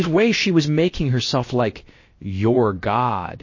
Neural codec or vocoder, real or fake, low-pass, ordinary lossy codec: codec, 16 kHz, about 1 kbps, DyCAST, with the encoder's durations; fake; 7.2 kHz; MP3, 32 kbps